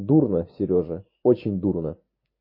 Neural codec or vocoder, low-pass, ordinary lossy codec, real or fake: none; 5.4 kHz; MP3, 24 kbps; real